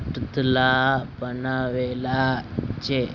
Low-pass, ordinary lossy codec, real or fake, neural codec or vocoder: 7.2 kHz; Opus, 64 kbps; real; none